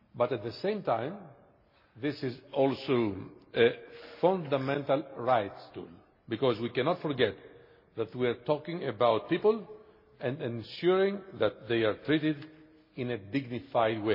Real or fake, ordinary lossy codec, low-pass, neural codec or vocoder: real; MP3, 24 kbps; 5.4 kHz; none